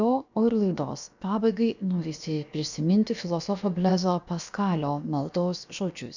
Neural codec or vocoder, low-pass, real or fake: codec, 16 kHz, about 1 kbps, DyCAST, with the encoder's durations; 7.2 kHz; fake